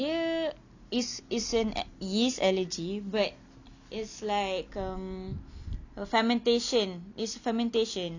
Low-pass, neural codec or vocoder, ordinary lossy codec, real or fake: 7.2 kHz; none; none; real